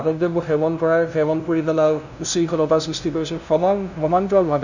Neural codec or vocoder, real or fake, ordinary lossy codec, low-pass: codec, 16 kHz, 0.5 kbps, FunCodec, trained on LibriTTS, 25 frames a second; fake; none; 7.2 kHz